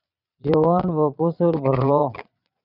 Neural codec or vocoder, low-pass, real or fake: vocoder, 22.05 kHz, 80 mel bands, Vocos; 5.4 kHz; fake